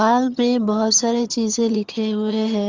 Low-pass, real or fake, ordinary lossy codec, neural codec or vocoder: 7.2 kHz; fake; Opus, 32 kbps; vocoder, 22.05 kHz, 80 mel bands, HiFi-GAN